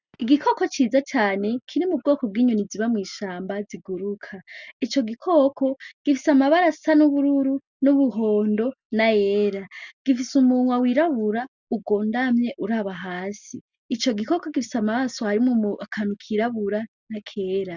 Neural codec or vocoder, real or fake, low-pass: none; real; 7.2 kHz